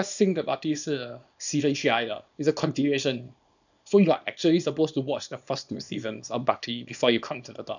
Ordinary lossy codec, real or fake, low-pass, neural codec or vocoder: none; fake; 7.2 kHz; codec, 24 kHz, 0.9 kbps, WavTokenizer, small release